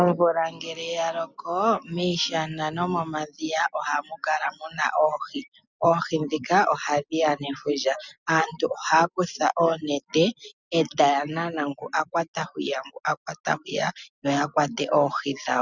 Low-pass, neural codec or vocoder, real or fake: 7.2 kHz; none; real